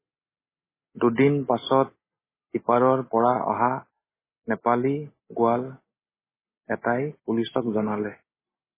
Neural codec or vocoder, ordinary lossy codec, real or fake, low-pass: none; MP3, 16 kbps; real; 3.6 kHz